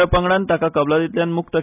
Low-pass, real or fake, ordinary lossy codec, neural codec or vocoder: 3.6 kHz; real; none; none